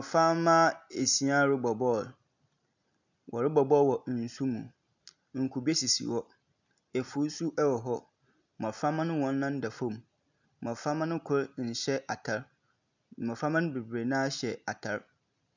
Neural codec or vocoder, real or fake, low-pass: none; real; 7.2 kHz